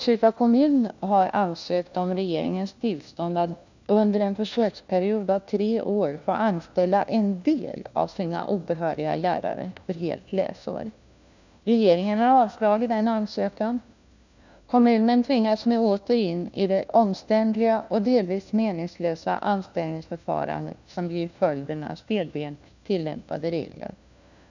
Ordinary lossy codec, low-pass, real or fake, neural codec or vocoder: none; 7.2 kHz; fake; codec, 16 kHz, 1 kbps, FunCodec, trained on LibriTTS, 50 frames a second